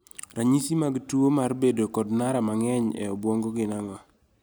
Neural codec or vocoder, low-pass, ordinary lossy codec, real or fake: none; none; none; real